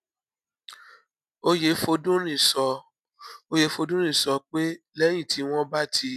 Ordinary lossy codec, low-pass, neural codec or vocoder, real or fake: none; 14.4 kHz; none; real